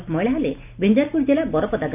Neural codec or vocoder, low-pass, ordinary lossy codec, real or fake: none; 3.6 kHz; none; real